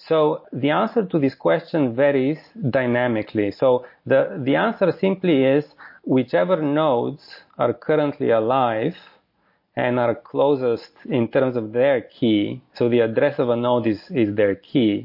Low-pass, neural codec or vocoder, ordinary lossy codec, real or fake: 5.4 kHz; none; MP3, 32 kbps; real